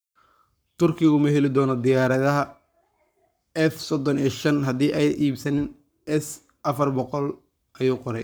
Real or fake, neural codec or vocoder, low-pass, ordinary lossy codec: fake; codec, 44.1 kHz, 7.8 kbps, Pupu-Codec; none; none